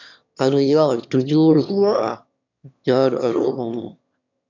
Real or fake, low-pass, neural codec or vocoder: fake; 7.2 kHz; autoencoder, 22.05 kHz, a latent of 192 numbers a frame, VITS, trained on one speaker